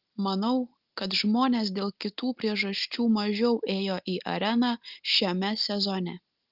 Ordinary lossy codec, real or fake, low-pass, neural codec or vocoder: Opus, 32 kbps; real; 5.4 kHz; none